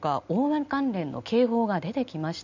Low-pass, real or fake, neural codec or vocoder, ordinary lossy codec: 7.2 kHz; real; none; none